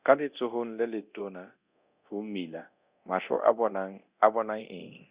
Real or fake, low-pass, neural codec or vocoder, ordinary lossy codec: fake; 3.6 kHz; codec, 24 kHz, 0.9 kbps, DualCodec; Opus, 64 kbps